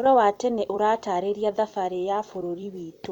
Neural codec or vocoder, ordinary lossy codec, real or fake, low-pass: vocoder, 44.1 kHz, 128 mel bands every 256 samples, BigVGAN v2; none; fake; 19.8 kHz